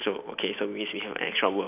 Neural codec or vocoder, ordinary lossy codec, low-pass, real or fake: none; none; 3.6 kHz; real